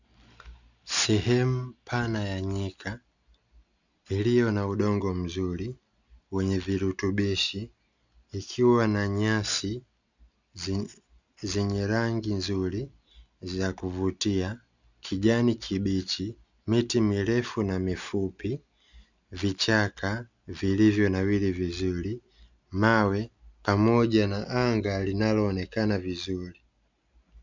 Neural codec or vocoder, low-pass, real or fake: none; 7.2 kHz; real